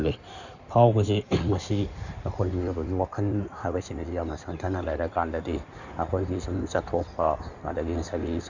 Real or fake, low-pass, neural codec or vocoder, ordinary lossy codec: fake; 7.2 kHz; codec, 16 kHz in and 24 kHz out, 2.2 kbps, FireRedTTS-2 codec; none